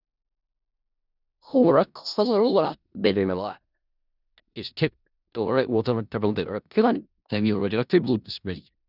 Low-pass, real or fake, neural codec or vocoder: 5.4 kHz; fake; codec, 16 kHz in and 24 kHz out, 0.4 kbps, LongCat-Audio-Codec, four codebook decoder